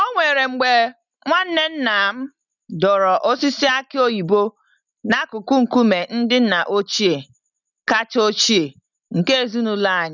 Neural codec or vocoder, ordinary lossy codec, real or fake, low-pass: none; none; real; 7.2 kHz